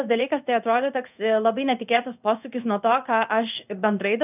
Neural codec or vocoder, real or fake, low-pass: codec, 16 kHz in and 24 kHz out, 1 kbps, XY-Tokenizer; fake; 3.6 kHz